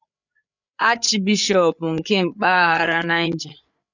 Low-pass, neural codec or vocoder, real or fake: 7.2 kHz; codec, 16 kHz, 8 kbps, FreqCodec, larger model; fake